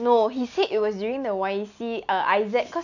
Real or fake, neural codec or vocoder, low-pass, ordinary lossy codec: real; none; 7.2 kHz; none